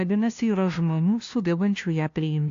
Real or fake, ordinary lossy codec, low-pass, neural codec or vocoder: fake; AAC, 48 kbps; 7.2 kHz; codec, 16 kHz, 0.5 kbps, FunCodec, trained on LibriTTS, 25 frames a second